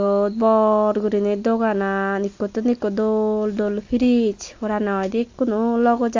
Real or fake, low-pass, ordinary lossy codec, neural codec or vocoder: real; 7.2 kHz; AAC, 48 kbps; none